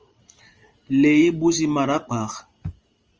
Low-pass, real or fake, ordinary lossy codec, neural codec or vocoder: 7.2 kHz; fake; Opus, 24 kbps; vocoder, 44.1 kHz, 128 mel bands every 512 samples, BigVGAN v2